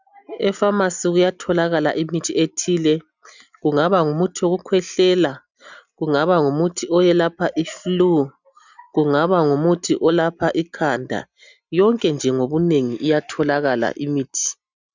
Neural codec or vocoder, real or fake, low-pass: none; real; 7.2 kHz